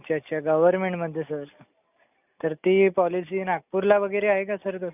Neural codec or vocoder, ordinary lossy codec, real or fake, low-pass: none; none; real; 3.6 kHz